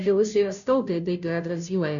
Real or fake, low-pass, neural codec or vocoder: fake; 7.2 kHz; codec, 16 kHz, 0.5 kbps, FunCodec, trained on Chinese and English, 25 frames a second